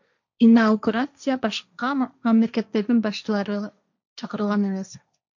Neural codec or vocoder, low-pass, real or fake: codec, 16 kHz, 1.1 kbps, Voila-Tokenizer; 7.2 kHz; fake